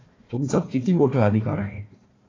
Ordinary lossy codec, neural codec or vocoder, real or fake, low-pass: AAC, 32 kbps; codec, 16 kHz, 1 kbps, FunCodec, trained on Chinese and English, 50 frames a second; fake; 7.2 kHz